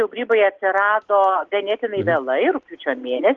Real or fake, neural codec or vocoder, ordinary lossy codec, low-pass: real; none; Opus, 16 kbps; 7.2 kHz